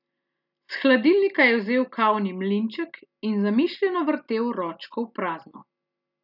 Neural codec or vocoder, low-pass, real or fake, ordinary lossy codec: none; 5.4 kHz; real; none